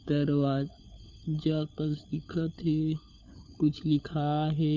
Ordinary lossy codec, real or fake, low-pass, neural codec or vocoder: none; fake; 7.2 kHz; codec, 16 kHz, 4 kbps, FunCodec, trained on LibriTTS, 50 frames a second